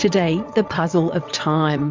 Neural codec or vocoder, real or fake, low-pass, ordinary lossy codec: vocoder, 22.05 kHz, 80 mel bands, WaveNeXt; fake; 7.2 kHz; MP3, 64 kbps